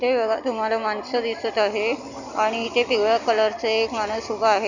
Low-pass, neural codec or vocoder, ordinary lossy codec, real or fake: 7.2 kHz; none; none; real